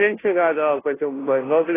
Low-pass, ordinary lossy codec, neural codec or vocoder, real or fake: 3.6 kHz; AAC, 16 kbps; codec, 16 kHz, 0.5 kbps, FunCodec, trained on Chinese and English, 25 frames a second; fake